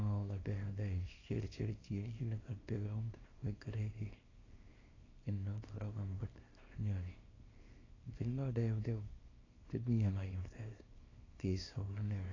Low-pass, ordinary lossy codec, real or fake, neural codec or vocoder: 7.2 kHz; AAC, 32 kbps; fake; codec, 24 kHz, 0.9 kbps, WavTokenizer, small release